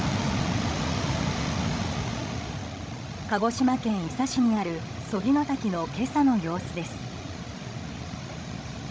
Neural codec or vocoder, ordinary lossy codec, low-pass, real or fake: codec, 16 kHz, 16 kbps, FreqCodec, larger model; none; none; fake